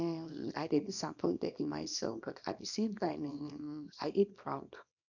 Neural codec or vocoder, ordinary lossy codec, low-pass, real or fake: codec, 24 kHz, 0.9 kbps, WavTokenizer, small release; none; 7.2 kHz; fake